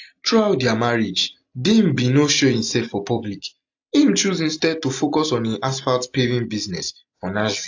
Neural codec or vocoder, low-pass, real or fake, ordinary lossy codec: none; 7.2 kHz; real; AAC, 48 kbps